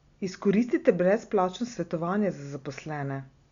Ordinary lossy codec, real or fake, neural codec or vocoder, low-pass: Opus, 64 kbps; real; none; 7.2 kHz